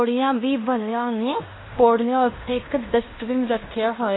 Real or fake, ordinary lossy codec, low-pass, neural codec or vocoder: fake; AAC, 16 kbps; 7.2 kHz; codec, 16 kHz in and 24 kHz out, 0.9 kbps, LongCat-Audio-Codec, fine tuned four codebook decoder